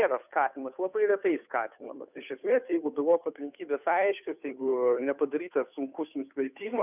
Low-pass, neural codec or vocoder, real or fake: 3.6 kHz; codec, 16 kHz, 2 kbps, FunCodec, trained on Chinese and English, 25 frames a second; fake